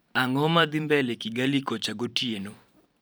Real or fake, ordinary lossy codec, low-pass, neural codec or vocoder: fake; none; none; vocoder, 44.1 kHz, 128 mel bands every 512 samples, BigVGAN v2